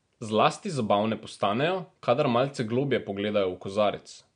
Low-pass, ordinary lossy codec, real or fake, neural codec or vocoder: 9.9 kHz; MP3, 64 kbps; real; none